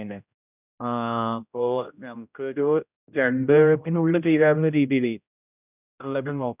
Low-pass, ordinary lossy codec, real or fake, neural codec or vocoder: 3.6 kHz; none; fake; codec, 16 kHz, 0.5 kbps, X-Codec, HuBERT features, trained on general audio